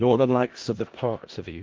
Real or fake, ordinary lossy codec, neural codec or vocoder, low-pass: fake; Opus, 16 kbps; codec, 16 kHz in and 24 kHz out, 0.4 kbps, LongCat-Audio-Codec, four codebook decoder; 7.2 kHz